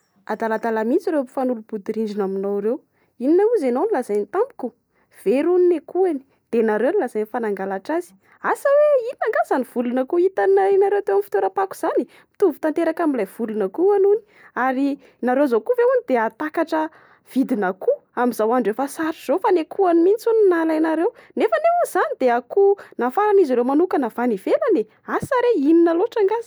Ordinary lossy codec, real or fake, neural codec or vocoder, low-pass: none; real; none; none